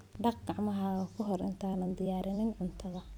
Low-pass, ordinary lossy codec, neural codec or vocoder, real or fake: 19.8 kHz; none; vocoder, 44.1 kHz, 128 mel bands every 256 samples, BigVGAN v2; fake